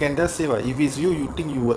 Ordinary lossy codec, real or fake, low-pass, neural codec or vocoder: none; fake; none; vocoder, 22.05 kHz, 80 mel bands, WaveNeXt